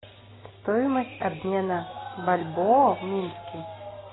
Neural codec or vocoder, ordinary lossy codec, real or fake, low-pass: none; AAC, 16 kbps; real; 7.2 kHz